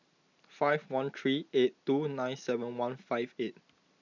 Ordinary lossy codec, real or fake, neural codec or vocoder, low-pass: none; real; none; 7.2 kHz